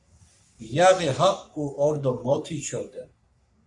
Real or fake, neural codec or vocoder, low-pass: fake; codec, 44.1 kHz, 3.4 kbps, Pupu-Codec; 10.8 kHz